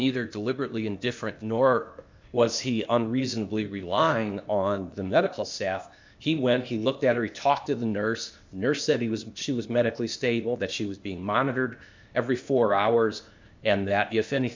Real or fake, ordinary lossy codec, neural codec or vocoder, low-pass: fake; MP3, 64 kbps; codec, 16 kHz, 0.8 kbps, ZipCodec; 7.2 kHz